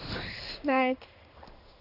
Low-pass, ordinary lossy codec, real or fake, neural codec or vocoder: 5.4 kHz; none; fake; codec, 16 kHz in and 24 kHz out, 1 kbps, XY-Tokenizer